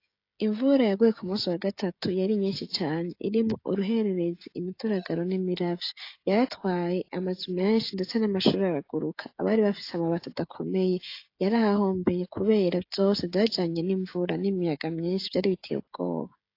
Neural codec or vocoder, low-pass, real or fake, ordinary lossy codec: codec, 16 kHz, 16 kbps, FreqCodec, smaller model; 5.4 kHz; fake; AAC, 32 kbps